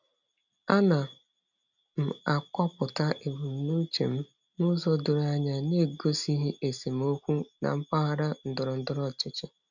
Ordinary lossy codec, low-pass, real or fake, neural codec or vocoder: none; 7.2 kHz; real; none